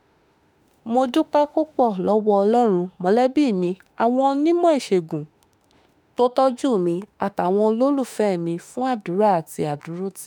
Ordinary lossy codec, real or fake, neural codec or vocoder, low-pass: none; fake; autoencoder, 48 kHz, 32 numbers a frame, DAC-VAE, trained on Japanese speech; none